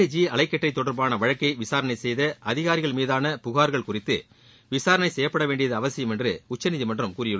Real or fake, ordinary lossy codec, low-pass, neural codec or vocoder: real; none; none; none